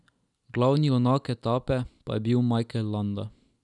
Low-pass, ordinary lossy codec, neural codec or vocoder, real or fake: 10.8 kHz; none; none; real